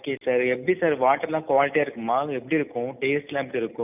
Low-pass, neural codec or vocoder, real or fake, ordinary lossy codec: 3.6 kHz; none; real; none